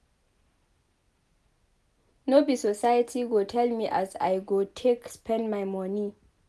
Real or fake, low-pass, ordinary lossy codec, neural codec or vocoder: real; none; none; none